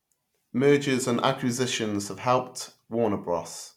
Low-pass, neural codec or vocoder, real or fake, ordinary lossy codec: 19.8 kHz; none; real; MP3, 96 kbps